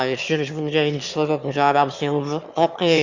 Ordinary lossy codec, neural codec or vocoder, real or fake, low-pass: Opus, 64 kbps; autoencoder, 22.05 kHz, a latent of 192 numbers a frame, VITS, trained on one speaker; fake; 7.2 kHz